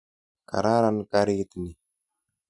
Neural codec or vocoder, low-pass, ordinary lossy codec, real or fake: none; 10.8 kHz; none; real